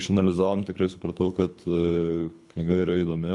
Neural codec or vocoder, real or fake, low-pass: codec, 24 kHz, 3 kbps, HILCodec; fake; 10.8 kHz